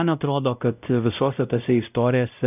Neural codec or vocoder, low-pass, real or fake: codec, 16 kHz, 0.5 kbps, X-Codec, WavLM features, trained on Multilingual LibriSpeech; 3.6 kHz; fake